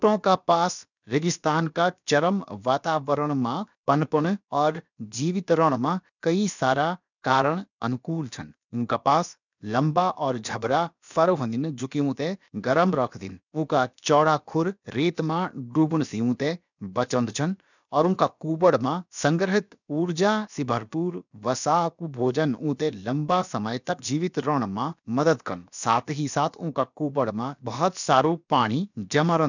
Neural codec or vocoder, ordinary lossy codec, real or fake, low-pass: codec, 16 kHz, about 1 kbps, DyCAST, with the encoder's durations; none; fake; 7.2 kHz